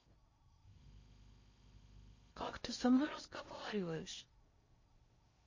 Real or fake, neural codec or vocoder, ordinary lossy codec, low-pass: fake; codec, 16 kHz in and 24 kHz out, 0.6 kbps, FocalCodec, streaming, 4096 codes; MP3, 32 kbps; 7.2 kHz